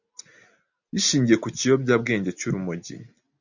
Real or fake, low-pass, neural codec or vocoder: real; 7.2 kHz; none